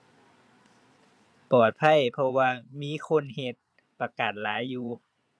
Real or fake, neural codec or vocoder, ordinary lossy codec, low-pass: fake; vocoder, 22.05 kHz, 80 mel bands, Vocos; none; none